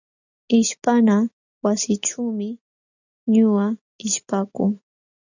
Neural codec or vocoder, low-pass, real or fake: none; 7.2 kHz; real